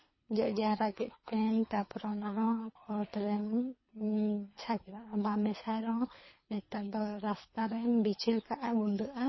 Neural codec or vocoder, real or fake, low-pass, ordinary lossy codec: codec, 24 kHz, 3 kbps, HILCodec; fake; 7.2 kHz; MP3, 24 kbps